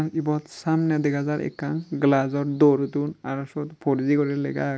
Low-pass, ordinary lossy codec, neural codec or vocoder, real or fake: none; none; none; real